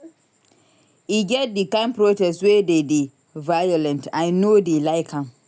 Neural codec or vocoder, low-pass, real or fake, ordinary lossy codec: none; none; real; none